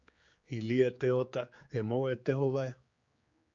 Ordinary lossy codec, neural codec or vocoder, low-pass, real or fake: Opus, 64 kbps; codec, 16 kHz, 4 kbps, X-Codec, HuBERT features, trained on general audio; 7.2 kHz; fake